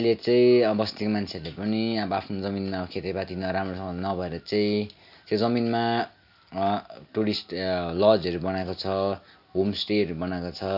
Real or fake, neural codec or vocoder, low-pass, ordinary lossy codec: real; none; 5.4 kHz; none